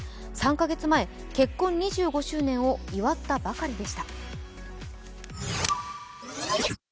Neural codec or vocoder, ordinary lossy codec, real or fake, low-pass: none; none; real; none